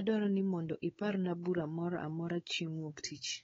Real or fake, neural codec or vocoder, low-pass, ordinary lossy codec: fake; codec, 16 kHz, 4 kbps, X-Codec, WavLM features, trained on Multilingual LibriSpeech; 7.2 kHz; AAC, 24 kbps